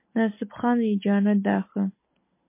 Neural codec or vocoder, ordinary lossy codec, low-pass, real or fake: none; MP3, 24 kbps; 3.6 kHz; real